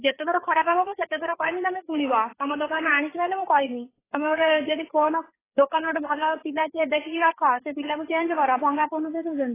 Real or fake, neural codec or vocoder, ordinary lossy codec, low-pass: fake; codec, 16 kHz, 4 kbps, FreqCodec, larger model; AAC, 16 kbps; 3.6 kHz